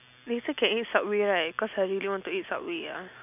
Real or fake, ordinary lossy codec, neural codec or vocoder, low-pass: real; none; none; 3.6 kHz